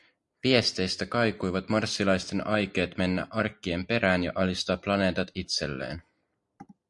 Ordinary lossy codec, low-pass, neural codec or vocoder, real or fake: MP3, 64 kbps; 10.8 kHz; none; real